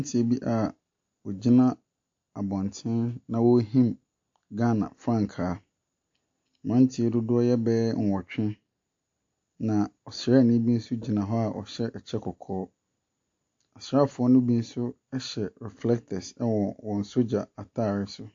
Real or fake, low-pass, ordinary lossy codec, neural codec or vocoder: real; 7.2 kHz; AAC, 48 kbps; none